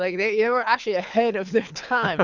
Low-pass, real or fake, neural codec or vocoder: 7.2 kHz; fake; codec, 24 kHz, 6 kbps, HILCodec